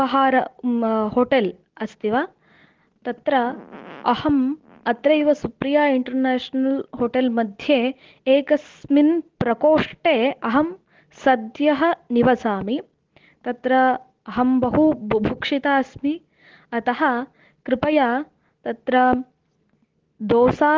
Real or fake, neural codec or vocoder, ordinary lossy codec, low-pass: real; none; Opus, 16 kbps; 7.2 kHz